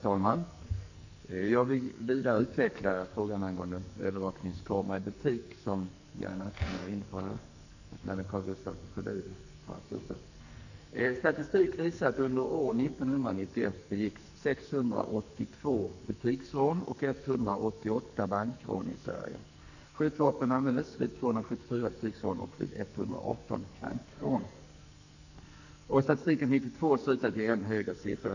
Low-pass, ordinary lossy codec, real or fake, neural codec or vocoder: 7.2 kHz; none; fake; codec, 44.1 kHz, 2.6 kbps, SNAC